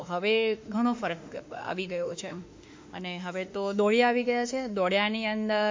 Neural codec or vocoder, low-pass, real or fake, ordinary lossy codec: autoencoder, 48 kHz, 32 numbers a frame, DAC-VAE, trained on Japanese speech; 7.2 kHz; fake; MP3, 48 kbps